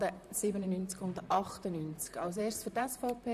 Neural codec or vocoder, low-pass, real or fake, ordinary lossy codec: vocoder, 44.1 kHz, 128 mel bands, Pupu-Vocoder; 14.4 kHz; fake; none